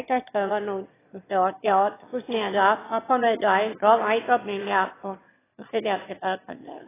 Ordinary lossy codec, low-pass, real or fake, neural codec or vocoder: AAC, 16 kbps; 3.6 kHz; fake; autoencoder, 22.05 kHz, a latent of 192 numbers a frame, VITS, trained on one speaker